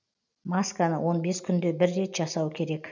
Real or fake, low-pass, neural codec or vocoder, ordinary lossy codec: real; 7.2 kHz; none; none